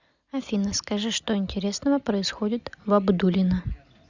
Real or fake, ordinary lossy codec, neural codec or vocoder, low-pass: real; Opus, 64 kbps; none; 7.2 kHz